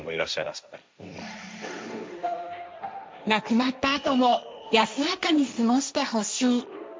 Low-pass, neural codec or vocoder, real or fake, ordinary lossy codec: none; codec, 16 kHz, 1.1 kbps, Voila-Tokenizer; fake; none